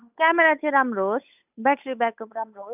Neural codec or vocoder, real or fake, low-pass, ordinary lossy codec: codec, 16 kHz, 8 kbps, FunCodec, trained on Chinese and English, 25 frames a second; fake; 3.6 kHz; none